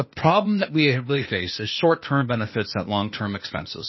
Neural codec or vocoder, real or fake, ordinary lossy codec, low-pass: codec, 16 kHz, 0.8 kbps, ZipCodec; fake; MP3, 24 kbps; 7.2 kHz